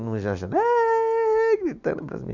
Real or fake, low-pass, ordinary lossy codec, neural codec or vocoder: real; 7.2 kHz; Opus, 64 kbps; none